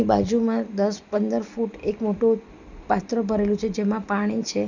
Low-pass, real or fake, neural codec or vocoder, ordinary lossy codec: 7.2 kHz; real; none; none